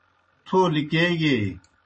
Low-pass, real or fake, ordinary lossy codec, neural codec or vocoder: 10.8 kHz; real; MP3, 32 kbps; none